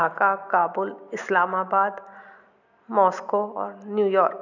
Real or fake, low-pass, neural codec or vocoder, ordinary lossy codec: real; 7.2 kHz; none; none